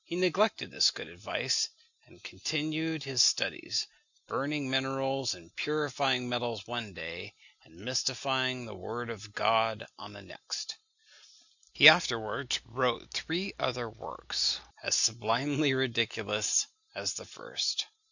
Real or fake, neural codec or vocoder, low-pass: real; none; 7.2 kHz